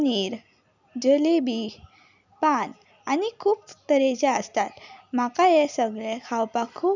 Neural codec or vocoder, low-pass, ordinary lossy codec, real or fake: none; 7.2 kHz; none; real